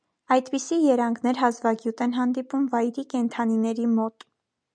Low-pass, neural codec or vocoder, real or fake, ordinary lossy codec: 9.9 kHz; none; real; MP3, 96 kbps